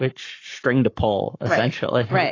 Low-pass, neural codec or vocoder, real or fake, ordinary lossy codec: 7.2 kHz; codec, 44.1 kHz, 7.8 kbps, Pupu-Codec; fake; AAC, 32 kbps